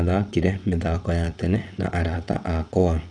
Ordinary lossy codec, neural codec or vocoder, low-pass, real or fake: none; vocoder, 22.05 kHz, 80 mel bands, WaveNeXt; 9.9 kHz; fake